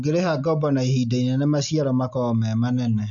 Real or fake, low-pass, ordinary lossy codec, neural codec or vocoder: real; 7.2 kHz; none; none